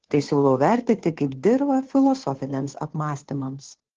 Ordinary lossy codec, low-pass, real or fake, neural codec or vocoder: Opus, 16 kbps; 7.2 kHz; fake; codec, 16 kHz, 2 kbps, FunCodec, trained on Chinese and English, 25 frames a second